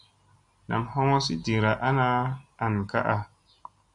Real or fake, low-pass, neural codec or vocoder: real; 10.8 kHz; none